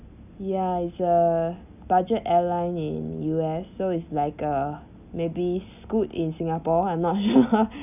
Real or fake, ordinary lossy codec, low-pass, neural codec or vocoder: real; none; 3.6 kHz; none